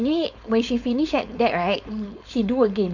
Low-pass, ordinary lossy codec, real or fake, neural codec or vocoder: 7.2 kHz; none; fake; codec, 16 kHz, 4.8 kbps, FACodec